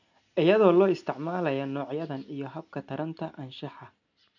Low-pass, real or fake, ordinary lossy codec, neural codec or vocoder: 7.2 kHz; real; none; none